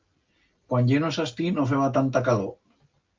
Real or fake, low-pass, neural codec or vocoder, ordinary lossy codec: real; 7.2 kHz; none; Opus, 24 kbps